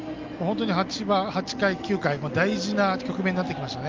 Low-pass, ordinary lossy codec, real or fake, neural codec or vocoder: 7.2 kHz; Opus, 24 kbps; real; none